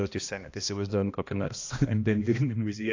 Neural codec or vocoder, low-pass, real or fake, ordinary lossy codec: codec, 16 kHz, 1 kbps, X-Codec, HuBERT features, trained on balanced general audio; 7.2 kHz; fake; AAC, 48 kbps